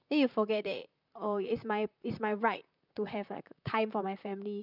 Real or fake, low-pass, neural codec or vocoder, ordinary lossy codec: fake; 5.4 kHz; vocoder, 44.1 kHz, 128 mel bands, Pupu-Vocoder; none